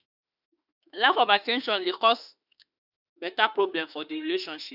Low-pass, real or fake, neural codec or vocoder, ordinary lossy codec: 5.4 kHz; fake; autoencoder, 48 kHz, 32 numbers a frame, DAC-VAE, trained on Japanese speech; none